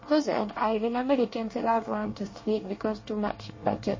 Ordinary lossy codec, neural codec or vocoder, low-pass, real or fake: MP3, 32 kbps; codec, 24 kHz, 1 kbps, SNAC; 7.2 kHz; fake